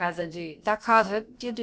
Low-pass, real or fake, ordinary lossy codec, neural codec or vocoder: none; fake; none; codec, 16 kHz, about 1 kbps, DyCAST, with the encoder's durations